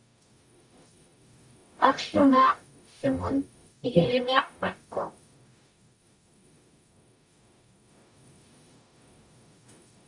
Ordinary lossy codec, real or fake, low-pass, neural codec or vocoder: MP3, 96 kbps; fake; 10.8 kHz; codec, 44.1 kHz, 0.9 kbps, DAC